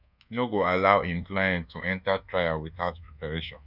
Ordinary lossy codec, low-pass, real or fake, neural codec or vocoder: none; 5.4 kHz; fake; codec, 24 kHz, 1.2 kbps, DualCodec